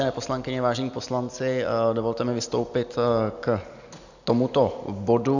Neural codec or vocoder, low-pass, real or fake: none; 7.2 kHz; real